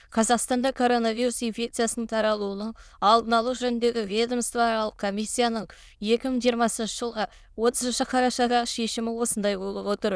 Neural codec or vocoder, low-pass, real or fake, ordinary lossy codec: autoencoder, 22.05 kHz, a latent of 192 numbers a frame, VITS, trained on many speakers; none; fake; none